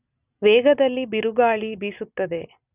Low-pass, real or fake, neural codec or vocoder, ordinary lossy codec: 3.6 kHz; real; none; none